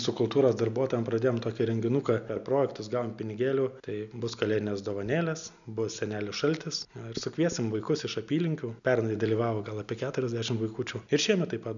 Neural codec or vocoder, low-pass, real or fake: none; 7.2 kHz; real